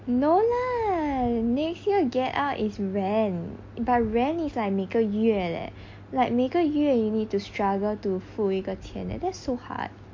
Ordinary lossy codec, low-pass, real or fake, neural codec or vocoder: MP3, 48 kbps; 7.2 kHz; real; none